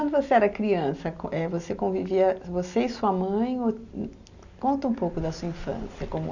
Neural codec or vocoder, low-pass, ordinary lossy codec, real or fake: none; 7.2 kHz; none; real